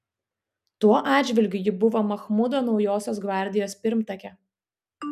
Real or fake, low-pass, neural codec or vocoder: real; 14.4 kHz; none